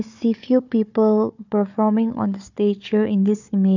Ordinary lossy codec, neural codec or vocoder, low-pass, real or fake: none; codec, 16 kHz, 4 kbps, FunCodec, trained on Chinese and English, 50 frames a second; 7.2 kHz; fake